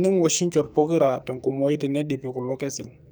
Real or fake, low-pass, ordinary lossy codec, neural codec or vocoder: fake; none; none; codec, 44.1 kHz, 2.6 kbps, SNAC